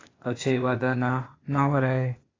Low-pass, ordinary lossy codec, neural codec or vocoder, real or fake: 7.2 kHz; AAC, 32 kbps; codec, 16 kHz, 0.8 kbps, ZipCodec; fake